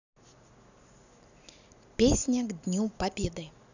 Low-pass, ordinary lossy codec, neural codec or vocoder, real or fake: 7.2 kHz; none; none; real